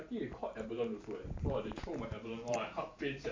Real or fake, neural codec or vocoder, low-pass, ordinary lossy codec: fake; vocoder, 44.1 kHz, 128 mel bands every 512 samples, BigVGAN v2; 7.2 kHz; MP3, 48 kbps